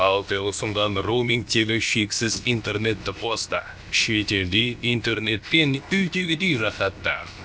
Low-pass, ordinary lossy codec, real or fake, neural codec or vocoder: none; none; fake; codec, 16 kHz, about 1 kbps, DyCAST, with the encoder's durations